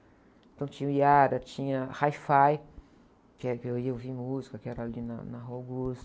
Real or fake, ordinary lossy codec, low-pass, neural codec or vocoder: real; none; none; none